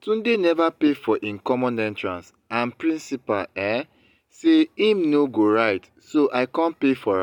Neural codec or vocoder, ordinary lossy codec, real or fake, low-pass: none; MP3, 96 kbps; real; 19.8 kHz